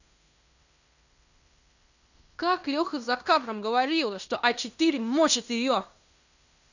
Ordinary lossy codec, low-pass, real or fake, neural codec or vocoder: none; 7.2 kHz; fake; codec, 16 kHz in and 24 kHz out, 0.9 kbps, LongCat-Audio-Codec, fine tuned four codebook decoder